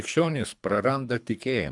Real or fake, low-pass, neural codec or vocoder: fake; 10.8 kHz; codec, 24 kHz, 3 kbps, HILCodec